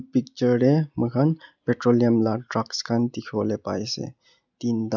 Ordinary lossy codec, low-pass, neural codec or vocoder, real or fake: none; 7.2 kHz; none; real